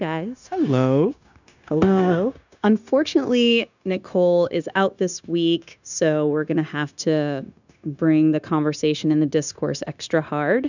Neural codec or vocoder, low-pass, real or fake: codec, 16 kHz, 0.9 kbps, LongCat-Audio-Codec; 7.2 kHz; fake